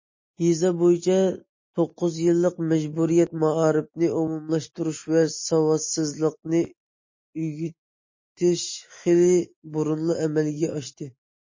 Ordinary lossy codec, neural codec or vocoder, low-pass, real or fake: MP3, 32 kbps; none; 7.2 kHz; real